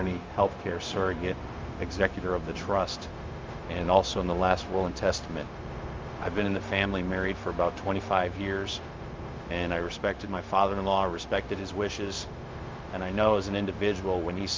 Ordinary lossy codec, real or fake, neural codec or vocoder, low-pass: Opus, 32 kbps; fake; codec, 16 kHz, 0.4 kbps, LongCat-Audio-Codec; 7.2 kHz